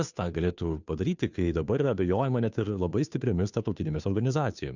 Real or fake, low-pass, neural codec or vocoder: fake; 7.2 kHz; codec, 16 kHz, 2 kbps, FunCodec, trained on Chinese and English, 25 frames a second